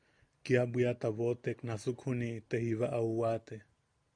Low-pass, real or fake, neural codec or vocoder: 9.9 kHz; real; none